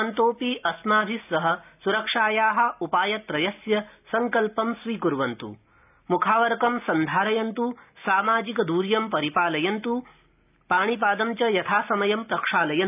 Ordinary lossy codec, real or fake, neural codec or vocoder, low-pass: none; real; none; 3.6 kHz